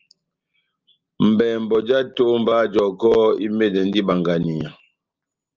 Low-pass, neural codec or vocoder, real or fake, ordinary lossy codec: 7.2 kHz; none; real; Opus, 32 kbps